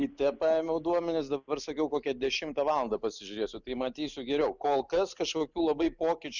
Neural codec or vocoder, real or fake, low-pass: none; real; 7.2 kHz